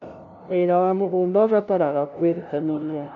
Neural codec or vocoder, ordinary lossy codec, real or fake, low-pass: codec, 16 kHz, 0.5 kbps, FunCodec, trained on LibriTTS, 25 frames a second; none; fake; 7.2 kHz